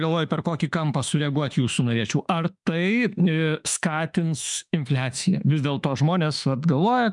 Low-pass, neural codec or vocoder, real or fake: 10.8 kHz; autoencoder, 48 kHz, 32 numbers a frame, DAC-VAE, trained on Japanese speech; fake